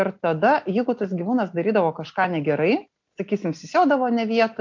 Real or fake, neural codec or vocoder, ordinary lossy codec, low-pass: real; none; MP3, 48 kbps; 7.2 kHz